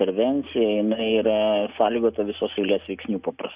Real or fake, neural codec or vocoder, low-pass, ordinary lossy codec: real; none; 3.6 kHz; Opus, 64 kbps